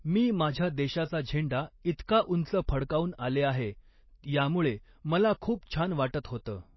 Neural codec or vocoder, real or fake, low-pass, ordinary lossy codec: none; real; 7.2 kHz; MP3, 24 kbps